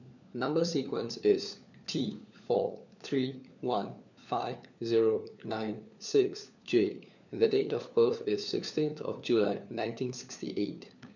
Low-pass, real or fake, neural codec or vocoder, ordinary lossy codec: 7.2 kHz; fake; codec, 16 kHz, 4 kbps, FunCodec, trained on LibriTTS, 50 frames a second; none